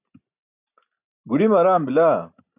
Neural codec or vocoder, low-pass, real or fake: none; 3.6 kHz; real